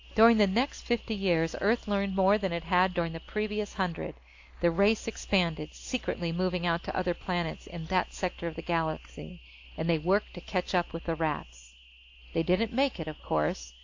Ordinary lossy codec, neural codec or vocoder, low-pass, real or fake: AAC, 48 kbps; none; 7.2 kHz; real